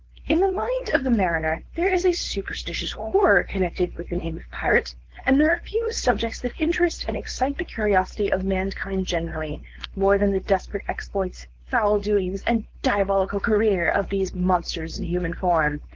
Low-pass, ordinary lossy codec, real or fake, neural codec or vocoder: 7.2 kHz; Opus, 16 kbps; fake; codec, 16 kHz, 4.8 kbps, FACodec